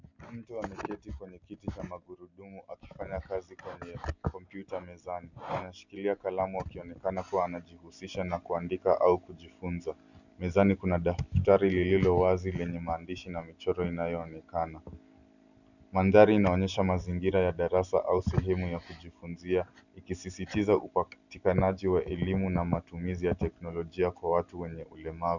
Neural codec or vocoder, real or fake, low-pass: none; real; 7.2 kHz